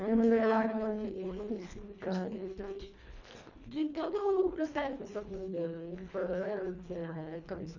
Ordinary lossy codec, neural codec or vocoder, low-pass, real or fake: none; codec, 24 kHz, 1.5 kbps, HILCodec; 7.2 kHz; fake